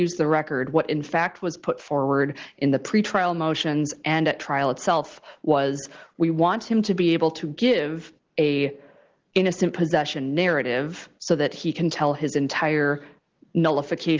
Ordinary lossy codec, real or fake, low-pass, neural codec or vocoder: Opus, 16 kbps; real; 7.2 kHz; none